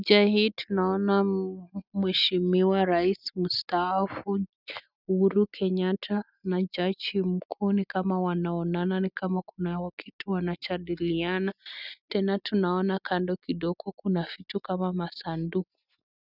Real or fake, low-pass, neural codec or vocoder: real; 5.4 kHz; none